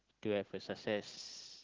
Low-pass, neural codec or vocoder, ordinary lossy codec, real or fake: 7.2 kHz; none; Opus, 16 kbps; real